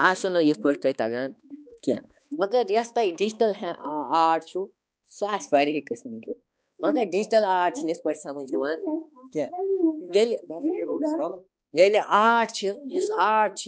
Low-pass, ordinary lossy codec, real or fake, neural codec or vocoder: none; none; fake; codec, 16 kHz, 2 kbps, X-Codec, HuBERT features, trained on balanced general audio